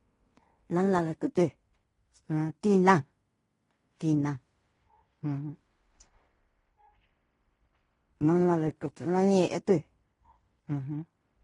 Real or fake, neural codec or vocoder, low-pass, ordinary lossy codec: fake; codec, 16 kHz in and 24 kHz out, 0.9 kbps, LongCat-Audio-Codec, fine tuned four codebook decoder; 10.8 kHz; AAC, 32 kbps